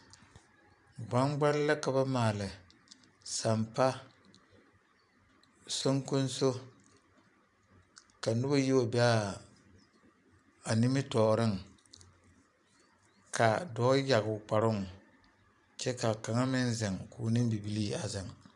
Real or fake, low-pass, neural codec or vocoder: fake; 10.8 kHz; vocoder, 44.1 kHz, 128 mel bands every 512 samples, BigVGAN v2